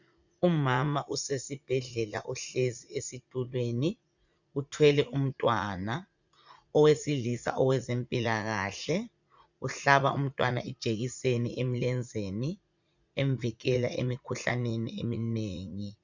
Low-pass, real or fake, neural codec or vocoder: 7.2 kHz; fake; vocoder, 44.1 kHz, 80 mel bands, Vocos